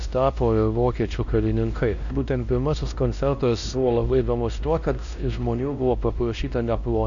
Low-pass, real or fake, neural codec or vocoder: 7.2 kHz; fake; codec, 16 kHz, 1 kbps, X-Codec, WavLM features, trained on Multilingual LibriSpeech